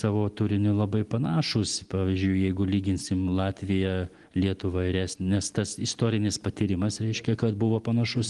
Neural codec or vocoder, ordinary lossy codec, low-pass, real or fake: none; Opus, 24 kbps; 10.8 kHz; real